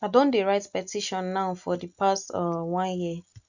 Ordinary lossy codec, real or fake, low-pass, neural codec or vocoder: none; real; 7.2 kHz; none